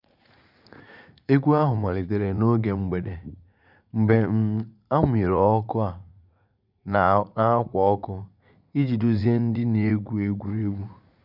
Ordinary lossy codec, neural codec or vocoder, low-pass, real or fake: none; vocoder, 44.1 kHz, 80 mel bands, Vocos; 5.4 kHz; fake